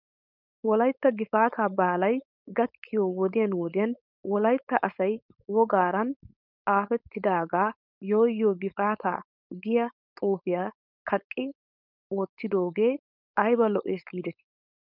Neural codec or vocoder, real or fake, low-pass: codec, 16 kHz, 4.8 kbps, FACodec; fake; 5.4 kHz